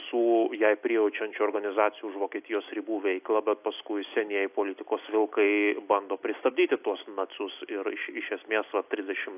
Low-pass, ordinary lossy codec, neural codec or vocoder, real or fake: 3.6 kHz; AAC, 32 kbps; none; real